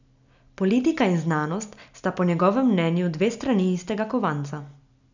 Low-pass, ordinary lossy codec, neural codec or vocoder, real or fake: 7.2 kHz; none; none; real